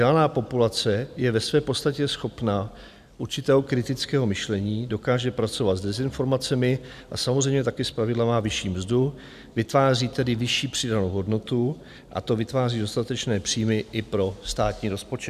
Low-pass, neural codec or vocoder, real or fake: 14.4 kHz; none; real